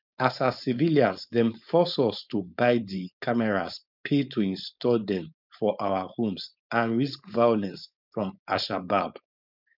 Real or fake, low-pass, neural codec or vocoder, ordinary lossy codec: fake; 5.4 kHz; codec, 16 kHz, 4.8 kbps, FACodec; none